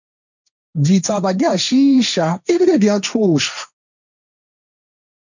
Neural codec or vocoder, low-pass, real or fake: codec, 16 kHz, 1.1 kbps, Voila-Tokenizer; 7.2 kHz; fake